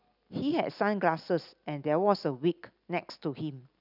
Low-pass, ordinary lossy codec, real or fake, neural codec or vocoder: 5.4 kHz; none; real; none